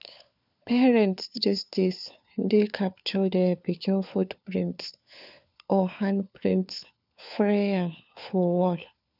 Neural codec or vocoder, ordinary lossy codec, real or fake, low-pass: codec, 16 kHz, 4 kbps, FunCodec, trained on LibriTTS, 50 frames a second; none; fake; 5.4 kHz